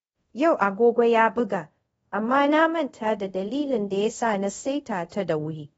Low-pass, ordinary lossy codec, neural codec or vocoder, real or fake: 10.8 kHz; AAC, 24 kbps; codec, 24 kHz, 0.5 kbps, DualCodec; fake